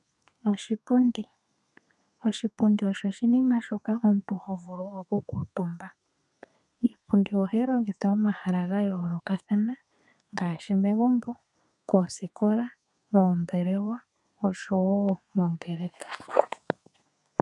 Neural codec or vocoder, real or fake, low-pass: codec, 32 kHz, 1.9 kbps, SNAC; fake; 10.8 kHz